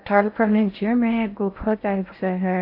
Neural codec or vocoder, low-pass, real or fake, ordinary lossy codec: codec, 16 kHz in and 24 kHz out, 0.6 kbps, FocalCodec, streaming, 4096 codes; 5.4 kHz; fake; AAC, 32 kbps